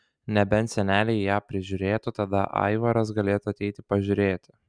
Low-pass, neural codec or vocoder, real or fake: 9.9 kHz; none; real